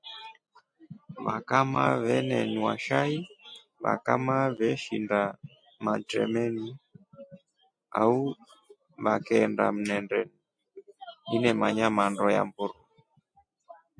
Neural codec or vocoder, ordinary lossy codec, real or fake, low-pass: none; MP3, 48 kbps; real; 9.9 kHz